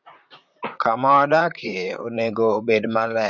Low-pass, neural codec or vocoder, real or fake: 7.2 kHz; vocoder, 44.1 kHz, 128 mel bands, Pupu-Vocoder; fake